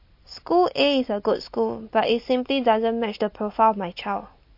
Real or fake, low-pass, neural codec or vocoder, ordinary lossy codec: real; 5.4 kHz; none; MP3, 32 kbps